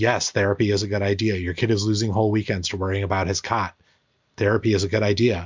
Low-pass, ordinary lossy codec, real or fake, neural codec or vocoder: 7.2 kHz; MP3, 64 kbps; real; none